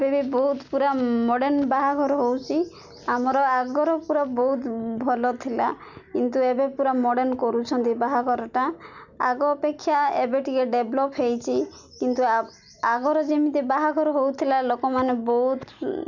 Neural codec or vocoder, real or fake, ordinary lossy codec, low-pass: none; real; none; 7.2 kHz